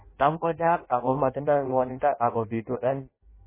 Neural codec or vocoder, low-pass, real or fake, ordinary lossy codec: codec, 16 kHz in and 24 kHz out, 0.6 kbps, FireRedTTS-2 codec; 3.6 kHz; fake; MP3, 16 kbps